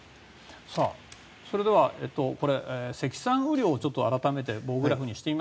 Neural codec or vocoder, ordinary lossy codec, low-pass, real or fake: none; none; none; real